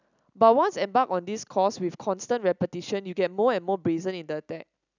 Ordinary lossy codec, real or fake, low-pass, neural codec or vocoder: none; real; 7.2 kHz; none